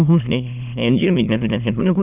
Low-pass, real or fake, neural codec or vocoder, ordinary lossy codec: 3.6 kHz; fake; autoencoder, 22.05 kHz, a latent of 192 numbers a frame, VITS, trained on many speakers; none